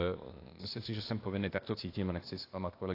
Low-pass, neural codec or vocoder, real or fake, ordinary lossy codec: 5.4 kHz; codec, 16 kHz, 0.8 kbps, ZipCodec; fake; AAC, 32 kbps